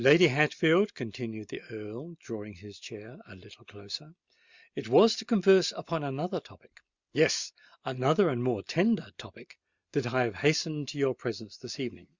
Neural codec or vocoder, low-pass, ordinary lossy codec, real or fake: none; 7.2 kHz; Opus, 64 kbps; real